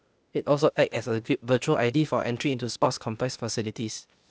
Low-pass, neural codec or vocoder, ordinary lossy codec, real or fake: none; codec, 16 kHz, 0.8 kbps, ZipCodec; none; fake